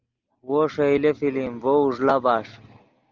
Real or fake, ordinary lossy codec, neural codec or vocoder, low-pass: real; Opus, 32 kbps; none; 7.2 kHz